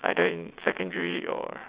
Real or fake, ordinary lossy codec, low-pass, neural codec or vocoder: fake; Opus, 64 kbps; 3.6 kHz; vocoder, 22.05 kHz, 80 mel bands, WaveNeXt